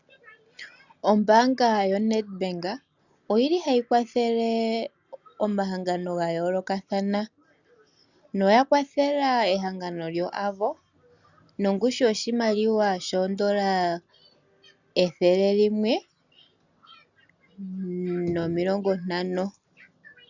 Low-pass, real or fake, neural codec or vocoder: 7.2 kHz; real; none